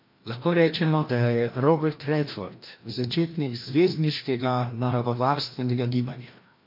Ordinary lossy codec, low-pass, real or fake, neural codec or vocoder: MP3, 32 kbps; 5.4 kHz; fake; codec, 16 kHz, 1 kbps, FreqCodec, larger model